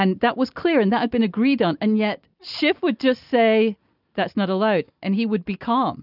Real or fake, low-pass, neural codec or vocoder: real; 5.4 kHz; none